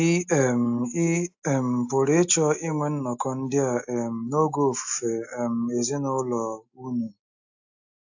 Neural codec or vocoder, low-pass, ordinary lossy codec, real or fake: none; 7.2 kHz; AAC, 48 kbps; real